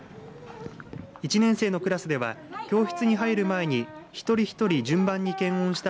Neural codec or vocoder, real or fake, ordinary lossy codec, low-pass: none; real; none; none